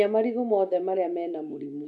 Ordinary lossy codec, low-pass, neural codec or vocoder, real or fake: none; none; none; real